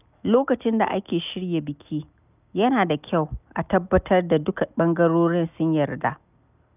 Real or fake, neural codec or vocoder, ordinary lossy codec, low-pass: real; none; none; 3.6 kHz